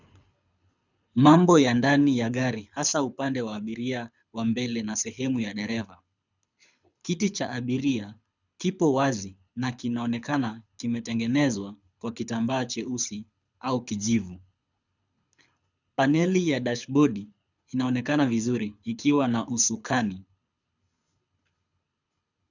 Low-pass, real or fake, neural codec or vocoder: 7.2 kHz; fake; codec, 24 kHz, 6 kbps, HILCodec